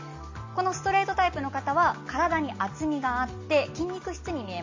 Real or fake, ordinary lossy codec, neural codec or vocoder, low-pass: real; MP3, 32 kbps; none; 7.2 kHz